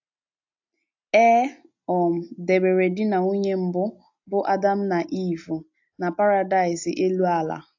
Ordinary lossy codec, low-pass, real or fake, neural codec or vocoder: AAC, 48 kbps; 7.2 kHz; real; none